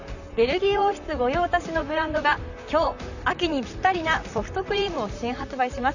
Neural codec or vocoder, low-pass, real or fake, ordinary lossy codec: vocoder, 44.1 kHz, 128 mel bands, Pupu-Vocoder; 7.2 kHz; fake; none